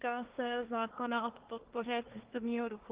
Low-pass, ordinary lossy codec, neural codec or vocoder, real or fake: 3.6 kHz; Opus, 16 kbps; codec, 24 kHz, 1 kbps, SNAC; fake